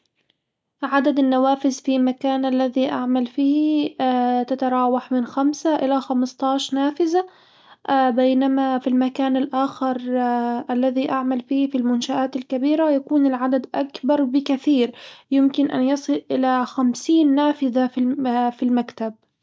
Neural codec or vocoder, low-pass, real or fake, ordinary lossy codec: none; none; real; none